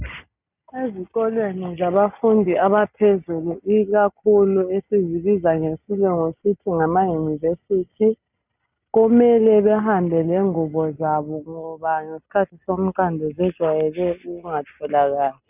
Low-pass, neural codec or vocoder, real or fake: 3.6 kHz; none; real